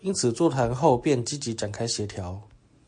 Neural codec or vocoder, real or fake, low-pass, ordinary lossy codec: none; real; 9.9 kHz; MP3, 48 kbps